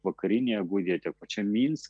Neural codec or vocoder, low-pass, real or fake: none; 9.9 kHz; real